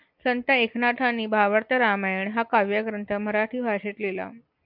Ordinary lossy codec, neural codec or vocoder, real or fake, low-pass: MP3, 48 kbps; none; real; 5.4 kHz